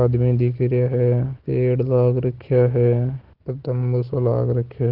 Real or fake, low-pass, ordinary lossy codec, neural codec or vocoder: real; 5.4 kHz; Opus, 16 kbps; none